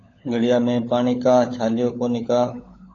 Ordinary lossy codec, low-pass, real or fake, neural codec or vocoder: AAC, 32 kbps; 7.2 kHz; fake; codec, 16 kHz, 16 kbps, FunCodec, trained on LibriTTS, 50 frames a second